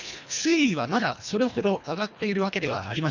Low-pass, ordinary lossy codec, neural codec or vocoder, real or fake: 7.2 kHz; none; codec, 24 kHz, 1.5 kbps, HILCodec; fake